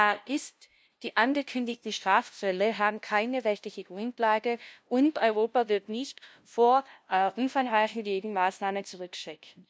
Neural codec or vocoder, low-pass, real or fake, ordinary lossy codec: codec, 16 kHz, 0.5 kbps, FunCodec, trained on LibriTTS, 25 frames a second; none; fake; none